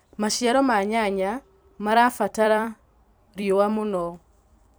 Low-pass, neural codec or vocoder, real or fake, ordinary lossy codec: none; vocoder, 44.1 kHz, 128 mel bands every 256 samples, BigVGAN v2; fake; none